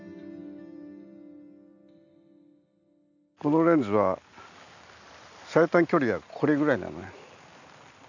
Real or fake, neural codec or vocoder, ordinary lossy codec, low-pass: real; none; none; 7.2 kHz